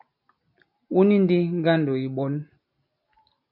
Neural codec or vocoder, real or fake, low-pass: none; real; 5.4 kHz